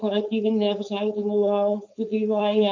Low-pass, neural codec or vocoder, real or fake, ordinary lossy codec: 7.2 kHz; codec, 16 kHz, 4.8 kbps, FACodec; fake; MP3, 64 kbps